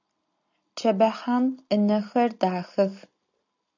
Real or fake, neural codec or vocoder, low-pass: real; none; 7.2 kHz